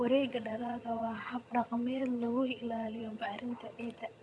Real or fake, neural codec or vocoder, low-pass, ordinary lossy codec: fake; vocoder, 22.05 kHz, 80 mel bands, HiFi-GAN; none; none